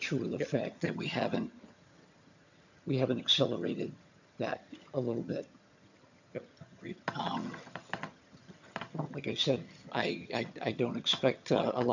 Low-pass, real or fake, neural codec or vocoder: 7.2 kHz; fake; vocoder, 22.05 kHz, 80 mel bands, HiFi-GAN